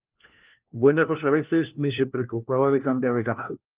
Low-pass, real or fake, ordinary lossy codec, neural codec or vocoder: 3.6 kHz; fake; Opus, 32 kbps; codec, 16 kHz, 1 kbps, FunCodec, trained on LibriTTS, 50 frames a second